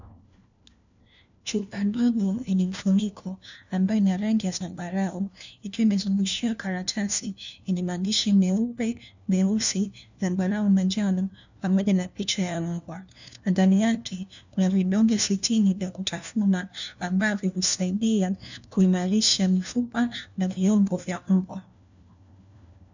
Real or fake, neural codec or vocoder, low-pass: fake; codec, 16 kHz, 1 kbps, FunCodec, trained on LibriTTS, 50 frames a second; 7.2 kHz